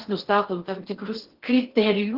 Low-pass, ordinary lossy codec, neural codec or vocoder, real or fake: 5.4 kHz; Opus, 16 kbps; codec, 16 kHz in and 24 kHz out, 0.8 kbps, FocalCodec, streaming, 65536 codes; fake